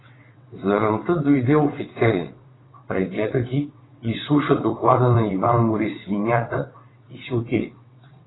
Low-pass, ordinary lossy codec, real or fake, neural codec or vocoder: 7.2 kHz; AAC, 16 kbps; fake; vocoder, 22.05 kHz, 80 mel bands, WaveNeXt